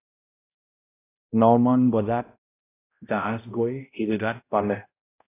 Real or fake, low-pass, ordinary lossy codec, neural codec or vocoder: fake; 3.6 kHz; AAC, 24 kbps; codec, 16 kHz, 0.5 kbps, X-Codec, HuBERT features, trained on balanced general audio